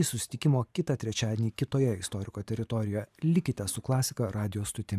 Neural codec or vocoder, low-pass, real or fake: vocoder, 44.1 kHz, 128 mel bands every 512 samples, BigVGAN v2; 14.4 kHz; fake